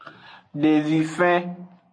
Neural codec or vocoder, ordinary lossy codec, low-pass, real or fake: none; AAC, 48 kbps; 9.9 kHz; real